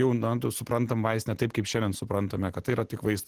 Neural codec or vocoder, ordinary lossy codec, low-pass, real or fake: none; Opus, 16 kbps; 14.4 kHz; real